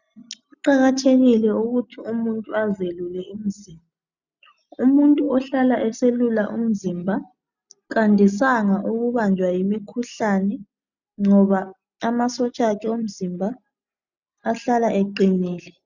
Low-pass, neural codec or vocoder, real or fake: 7.2 kHz; none; real